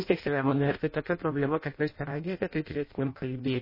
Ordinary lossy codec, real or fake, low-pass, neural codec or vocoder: MP3, 24 kbps; fake; 5.4 kHz; codec, 16 kHz in and 24 kHz out, 0.6 kbps, FireRedTTS-2 codec